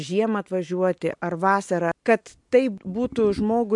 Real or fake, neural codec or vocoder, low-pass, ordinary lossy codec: real; none; 10.8 kHz; MP3, 64 kbps